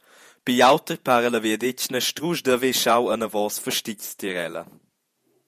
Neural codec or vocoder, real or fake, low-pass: vocoder, 48 kHz, 128 mel bands, Vocos; fake; 14.4 kHz